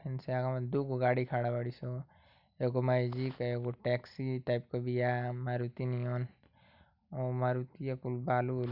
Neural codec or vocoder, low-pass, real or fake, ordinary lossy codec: none; 5.4 kHz; real; none